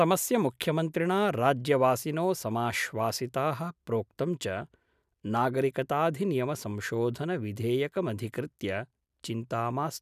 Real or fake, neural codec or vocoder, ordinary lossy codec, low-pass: real; none; none; 14.4 kHz